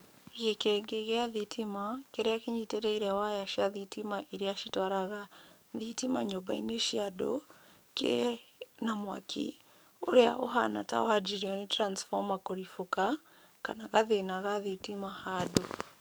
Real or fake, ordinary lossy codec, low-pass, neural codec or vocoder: fake; none; none; codec, 44.1 kHz, 7.8 kbps, DAC